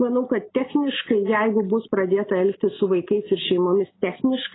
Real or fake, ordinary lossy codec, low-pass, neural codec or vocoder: real; AAC, 16 kbps; 7.2 kHz; none